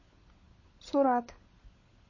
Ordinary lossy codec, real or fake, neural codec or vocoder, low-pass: MP3, 32 kbps; fake; codec, 16 kHz, 16 kbps, FunCodec, trained on LibriTTS, 50 frames a second; 7.2 kHz